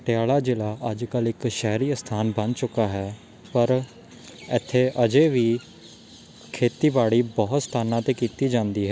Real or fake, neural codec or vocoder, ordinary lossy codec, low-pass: real; none; none; none